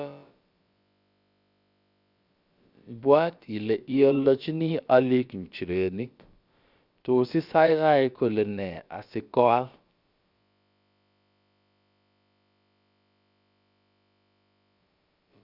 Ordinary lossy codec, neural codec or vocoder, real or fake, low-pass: Opus, 64 kbps; codec, 16 kHz, about 1 kbps, DyCAST, with the encoder's durations; fake; 5.4 kHz